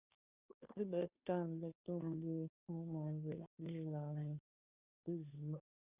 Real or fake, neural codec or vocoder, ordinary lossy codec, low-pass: fake; codec, 16 kHz, 2 kbps, FunCodec, trained on Chinese and English, 25 frames a second; Opus, 64 kbps; 3.6 kHz